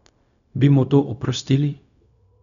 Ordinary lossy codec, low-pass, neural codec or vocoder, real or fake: none; 7.2 kHz; codec, 16 kHz, 0.4 kbps, LongCat-Audio-Codec; fake